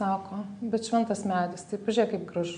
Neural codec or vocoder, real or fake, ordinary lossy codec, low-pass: none; real; AAC, 96 kbps; 9.9 kHz